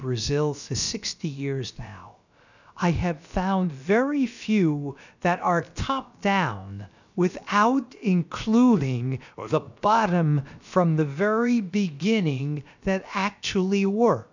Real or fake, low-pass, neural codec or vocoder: fake; 7.2 kHz; codec, 16 kHz, about 1 kbps, DyCAST, with the encoder's durations